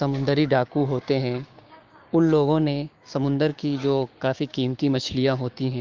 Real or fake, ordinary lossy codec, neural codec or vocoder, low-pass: fake; Opus, 32 kbps; codec, 16 kHz, 6 kbps, DAC; 7.2 kHz